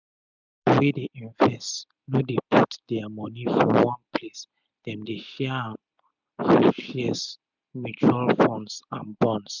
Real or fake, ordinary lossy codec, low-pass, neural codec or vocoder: real; none; 7.2 kHz; none